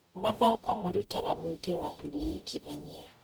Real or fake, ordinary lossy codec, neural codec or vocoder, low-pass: fake; none; codec, 44.1 kHz, 0.9 kbps, DAC; none